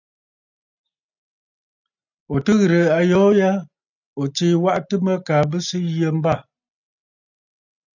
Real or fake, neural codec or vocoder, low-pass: real; none; 7.2 kHz